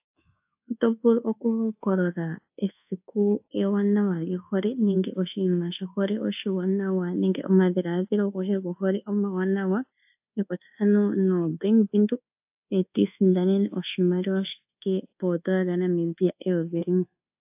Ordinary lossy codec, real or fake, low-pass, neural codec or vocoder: AAC, 32 kbps; fake; 3.6 kHz; codec, 24 kHz, 1.2 kbps, DualCodec